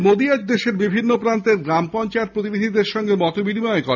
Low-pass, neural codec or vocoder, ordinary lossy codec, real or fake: 7.2 kHz; none; none; real